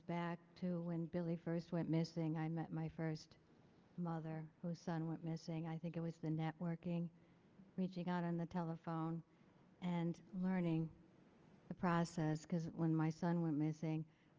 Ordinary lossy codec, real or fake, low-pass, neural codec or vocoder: Opus, 24 kbps; real; 7.2 kHz; none